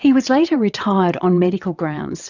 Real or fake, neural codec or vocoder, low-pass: fake; vocoder, 22.05 kHz, 80 mel bands, WaveNeXt; 7.2 kHz